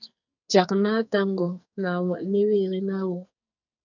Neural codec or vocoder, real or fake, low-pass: codec, 44.1 kHz, 2.6 kbps, SNAC; fake; 7.2 kHz